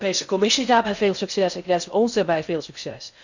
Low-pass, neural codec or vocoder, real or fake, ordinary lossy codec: 7.2 kHz; codec, 16 kHz in and 24 kHz out, 0.6 kbps, FocalCodec, streaming, 4096 codes; fake; none